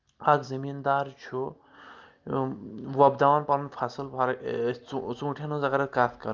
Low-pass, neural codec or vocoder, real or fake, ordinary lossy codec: 7.2 kHz; none; real; Opus, 32 kbps